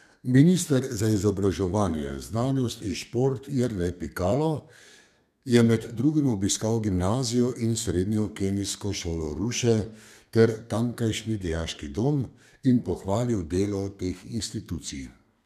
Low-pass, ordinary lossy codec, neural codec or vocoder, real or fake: 14.4 kHz; none; codec, 32 kHz, 1.9 kbps, SNAC; fake